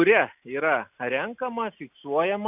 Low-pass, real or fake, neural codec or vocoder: 3.6 kHz; real; none